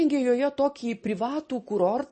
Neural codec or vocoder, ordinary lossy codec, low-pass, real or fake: none; MP3, 32 kbps; 10.8 kHz; real